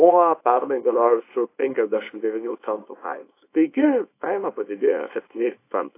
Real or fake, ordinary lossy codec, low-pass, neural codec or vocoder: fake; AAC, 24 kbps; 3.6 kHz; codec, 24 kHz, 0.9 kbps, WavTokenizer, small release